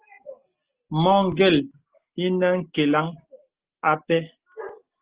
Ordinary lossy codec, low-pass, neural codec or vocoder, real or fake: Opus, 16 kbps; 3.6 kHz; none; real